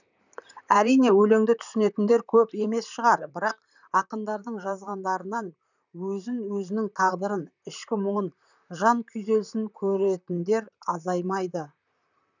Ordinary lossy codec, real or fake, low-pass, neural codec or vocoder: none; fake; 7.2 kHz; vocoder, 44.1 kHz, 128 mel bands, Pupu-Vocoder